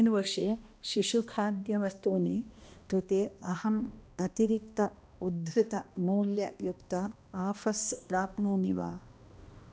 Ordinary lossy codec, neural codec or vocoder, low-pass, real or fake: none; codec, 16 kHz, 1 kbps, X-Codec, HuBERT features, trained on balanced general audio; none; fake